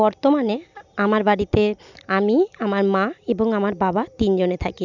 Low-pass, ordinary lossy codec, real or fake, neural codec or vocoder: 7.2 kHz; none; real; none